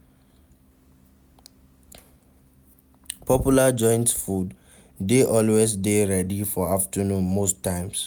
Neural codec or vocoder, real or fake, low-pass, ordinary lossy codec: none; real; none; none